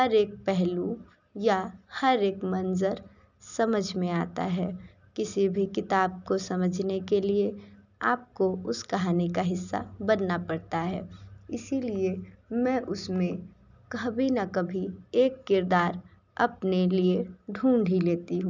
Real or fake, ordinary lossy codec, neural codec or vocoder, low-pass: real; none; none; 7.2 kHz